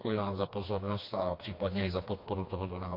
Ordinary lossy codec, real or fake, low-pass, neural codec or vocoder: MP3, 32 kbps; fake; 5.4 kHz; codec, 16 kHz, 2 kbps, FreqCodec, smaller model